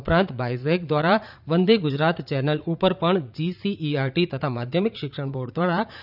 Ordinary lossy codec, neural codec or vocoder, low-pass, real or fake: none; vocoder, 44.1 kHz, 80 mel bands, Vocos; 5.4 kHz; fake